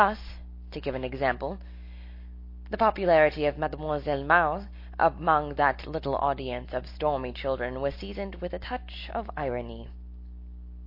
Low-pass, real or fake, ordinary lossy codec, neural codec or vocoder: 5.4 kHz; real; MP3, 32 kbps; none